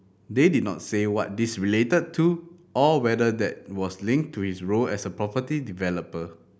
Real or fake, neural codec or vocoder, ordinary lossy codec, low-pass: real; none; none; none